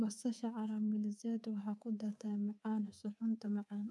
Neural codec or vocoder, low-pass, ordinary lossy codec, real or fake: codec, 24 kHz, 3.1 kbps, DualCodec; none; none; fake